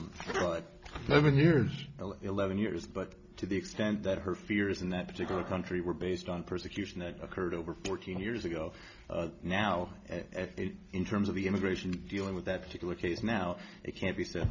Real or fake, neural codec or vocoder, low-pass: real; none; 7.2 kHz